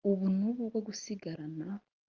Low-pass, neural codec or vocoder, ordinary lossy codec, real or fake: 7.2 kHz; none; Opus, 32 kbps; real